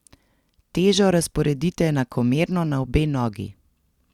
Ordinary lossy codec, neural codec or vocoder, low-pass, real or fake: Opus, 64 kbps; none; 19.8 kHz; real